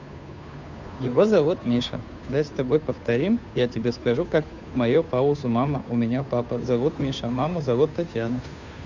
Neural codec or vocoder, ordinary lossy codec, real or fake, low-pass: codec, 16 kHz, 2 kbps, FunCodec, trained on Chinese and English, 25 frames a second; none; fake; 7.2 kHz